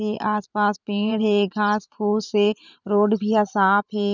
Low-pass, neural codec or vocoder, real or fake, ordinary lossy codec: 7.2 kHz; vocoder, 44.1 kHz, 128 mel bands every 512 samples, BigVGAN v2; fake; none